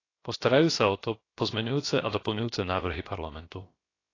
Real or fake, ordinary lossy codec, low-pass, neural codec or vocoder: fake; AAC, 32 kbps; 7.2 kHz; codec, 16 kHz, about 1 kbps, DyCAST, with the encoder's durations